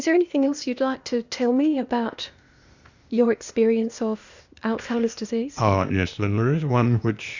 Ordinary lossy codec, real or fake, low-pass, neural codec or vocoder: Opus, 64 kbps; fake; 7.2 kHz; codec, 16 kHz, 0.8 kbps, ZipCodec